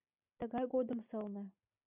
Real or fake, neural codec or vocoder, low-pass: real; none; 3.6 kHz